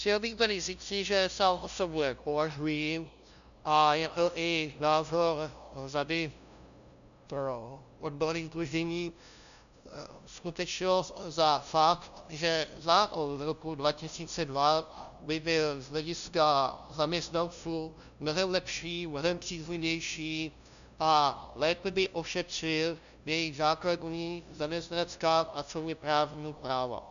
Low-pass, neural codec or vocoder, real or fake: 7.2 kHz; codec, 16 kHz, 0.5 kbps, FunCodec, trained on LibriTTS, 25 frames a second; fake